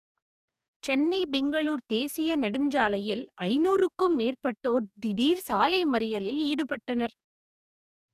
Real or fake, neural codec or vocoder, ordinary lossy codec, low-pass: fake; codec, 44.1 kHz, 2.6 kbps, DAC; none; 14.4 kHz